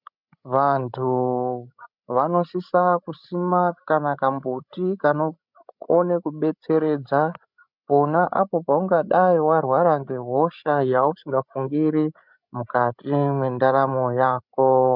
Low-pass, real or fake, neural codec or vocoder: 5.4 kHz; fake; codec, 16 kHz, 16 kbps, FreqCodec, larger model